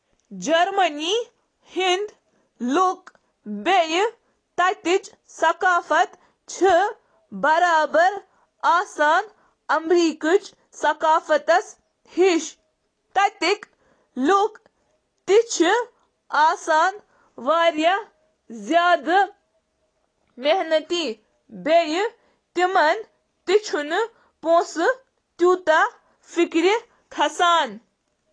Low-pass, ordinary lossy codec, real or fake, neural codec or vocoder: 9.9 kHz; AAC, 32 kbps; real; none